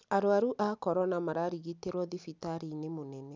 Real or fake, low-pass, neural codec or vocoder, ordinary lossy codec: real; none; none; none